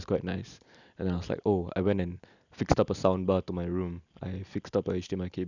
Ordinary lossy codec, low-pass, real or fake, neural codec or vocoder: none; 7.2 kHz; real; none